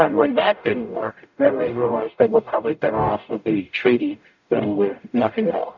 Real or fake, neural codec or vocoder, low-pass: fake; codec, 44.1 kHz, 0.9 kbps, DAC; 7.2 kHz